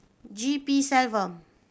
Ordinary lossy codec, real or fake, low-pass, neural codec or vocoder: none; real; none; none